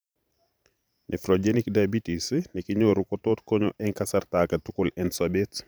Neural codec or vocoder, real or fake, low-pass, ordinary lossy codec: vocoder, 44.1 kHz, 128 mel bands every 512 samples, BigVGAN v2; fake; none; none